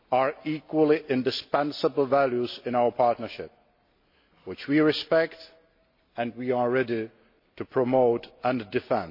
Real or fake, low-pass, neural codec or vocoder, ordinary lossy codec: real; 5.4 kHz; none; MP3, 32 kbps